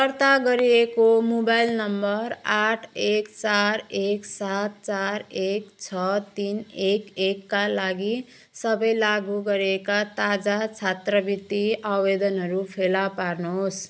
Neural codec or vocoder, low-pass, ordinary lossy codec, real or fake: none; none; none; real